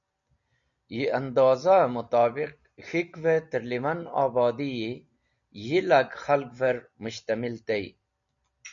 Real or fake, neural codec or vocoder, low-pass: real; none; 7.2 kHz